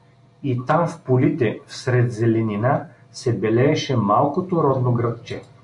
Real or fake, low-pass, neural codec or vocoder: real; 10.8 kHz; none